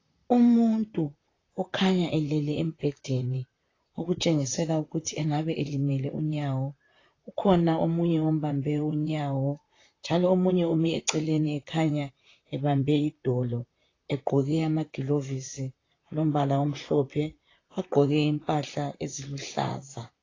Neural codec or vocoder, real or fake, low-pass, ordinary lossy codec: vocoder, 44.1 kHz, 128 mel bands, Pupu-Vocoder; fake; 7.2 kHz; AAC, 32 kbps